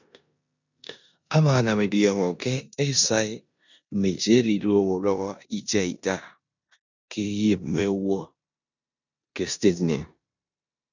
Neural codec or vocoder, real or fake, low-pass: codec, 16 kHz in and 24 kHz out, 0.9 kbps, LongCat-Audio-Codec, four codebook decoder; fake; 7.2 kHz